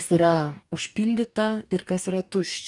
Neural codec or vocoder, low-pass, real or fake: codec, 44.1 kHz, 2.6 kbps, DAC; 10.8 kHz; fake